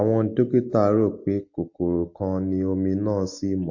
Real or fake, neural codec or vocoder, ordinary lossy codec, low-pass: real; none; MP3, 32 kbps; 7.2 kHz